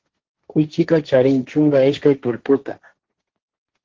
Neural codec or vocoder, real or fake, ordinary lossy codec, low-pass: codec, 16 kHz, 1.1 kbps, Voila-Tokenizer; fake; Opus, 16 kbps; 7.2 kHz